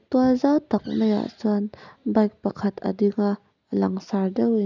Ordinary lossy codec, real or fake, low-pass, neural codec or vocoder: none; real; 7.2 kHz; none